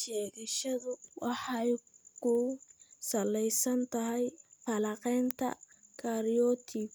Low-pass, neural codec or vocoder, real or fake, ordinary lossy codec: none; none; real; none